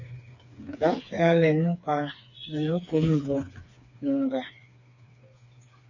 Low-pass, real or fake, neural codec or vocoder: 7.2 kHz; fake; codec, 16 kHz, 4 kbps, FreqCodec, smaller model